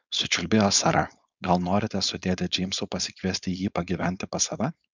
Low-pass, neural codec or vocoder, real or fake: 7.2 kHz; codec, 16 kHz, 4.8 kbps, FACodec; fake